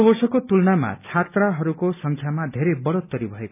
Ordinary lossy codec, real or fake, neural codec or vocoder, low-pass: none; real; none; 3.6 kHz